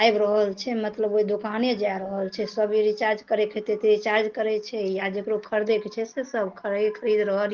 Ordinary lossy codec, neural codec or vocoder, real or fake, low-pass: Opus, 16 kbps; none; real; 7.2 kHz